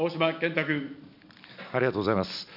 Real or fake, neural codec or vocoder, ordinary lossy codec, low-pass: real; none; none; 5.4 kHz